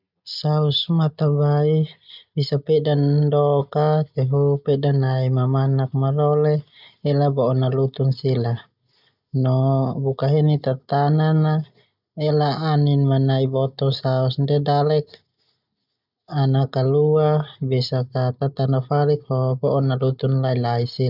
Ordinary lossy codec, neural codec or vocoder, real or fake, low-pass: none; none; real; 5.4 kHz